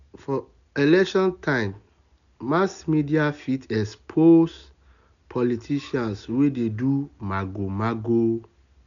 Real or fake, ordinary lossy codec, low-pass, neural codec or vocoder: real; none; 7.2 kHz; none